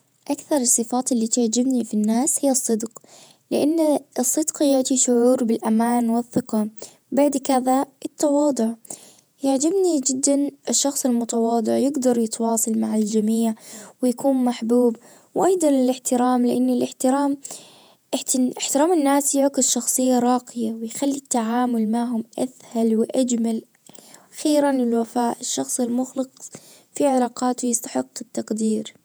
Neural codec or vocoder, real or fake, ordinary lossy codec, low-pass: vocoder, 48 kHz, 128 mel bands, Vocos; fake; none; none